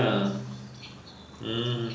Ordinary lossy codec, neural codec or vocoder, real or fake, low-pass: none; none; real; none